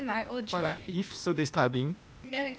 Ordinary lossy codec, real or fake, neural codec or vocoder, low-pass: none; fake; codec, 16 kHz, 0.8 kbps, ZipCodec; none